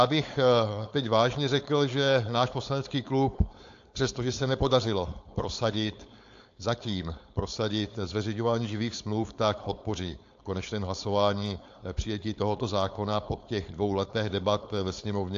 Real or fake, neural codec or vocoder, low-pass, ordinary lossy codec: fake; codec, 16 kHz, 4.8 kbps, FACodec; 7.2 kHz; AAC, 64 kbps